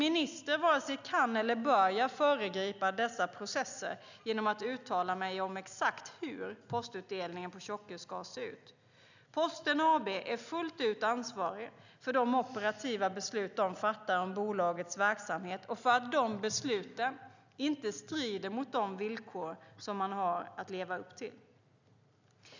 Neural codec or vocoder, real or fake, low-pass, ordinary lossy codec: none; real; 7.2 kHz; none